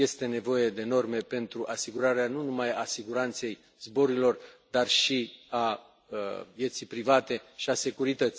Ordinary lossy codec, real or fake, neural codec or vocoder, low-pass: none; real; none; none